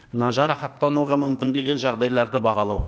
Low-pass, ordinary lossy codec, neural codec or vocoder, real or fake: none; none; codec, 16 kHz, 0.8 kbps, ZipCodec; fake